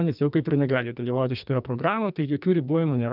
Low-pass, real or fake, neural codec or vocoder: 5.4 kHz; fake; codec, 44.1 kHz, 2.6 kbps, SNAC